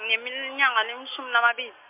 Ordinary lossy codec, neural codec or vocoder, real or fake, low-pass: MP3, 32 kbps; none; real; 3.6 kHz